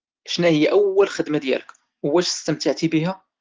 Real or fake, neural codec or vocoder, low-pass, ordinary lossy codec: real; none; 7.2 kHz; Opus, 16 kbps